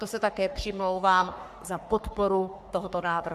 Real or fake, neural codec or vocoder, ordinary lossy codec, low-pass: fake; codec, 44.1 kHz, 3.4 kbps, Pupu-Codec; AAC, 96 kbps; 14.4 kHz